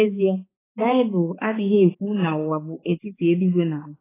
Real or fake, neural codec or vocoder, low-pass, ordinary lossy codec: fake; codec, 16 kHz, 4 kbps, X-Codec, HuBERT features, trained on balanced general audio; 3.6 kHz; AAC, 16 kbps